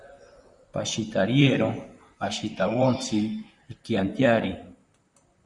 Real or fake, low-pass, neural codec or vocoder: fake; 10.8 kHz; vocoder, 44.1 kHz, 128 mel bands, Pupu-Vocoder